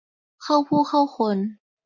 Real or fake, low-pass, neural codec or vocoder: real; 7.2 kHz; none